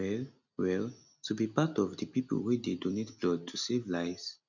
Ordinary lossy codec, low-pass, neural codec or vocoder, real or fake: none; 7.2 kHz; none; real